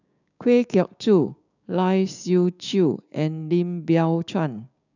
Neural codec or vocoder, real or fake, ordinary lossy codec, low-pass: codec, 16 kHz, 6 kbps, DAC; fake; none; 7.2 kHz